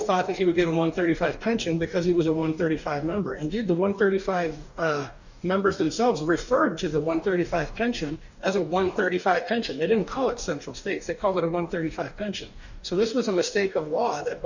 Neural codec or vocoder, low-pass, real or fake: codec, 44.1 kHz, 2.6 kbps, DAC; 7.2 kHz; fake